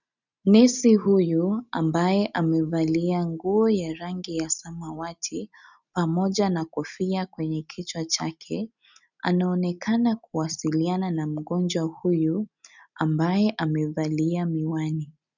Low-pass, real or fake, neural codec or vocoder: 7.2 kHz; real; none